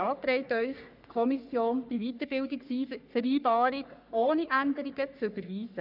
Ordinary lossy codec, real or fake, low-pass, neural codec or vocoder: none; fake; 5.4 kHz; codec, 44.1 kHz, 3.4 kbps, Pupu-Codec